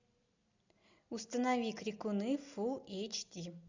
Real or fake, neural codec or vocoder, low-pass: real; none; 7.2 kHz